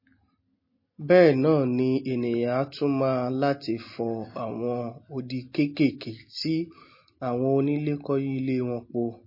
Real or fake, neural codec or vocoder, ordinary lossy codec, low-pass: real; none; MP3, 24 kbps; 5.4 kHz